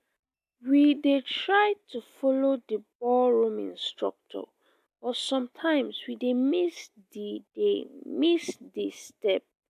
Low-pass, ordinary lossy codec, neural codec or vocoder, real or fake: 14.4 kHz; AAC, 96 kbps; none; real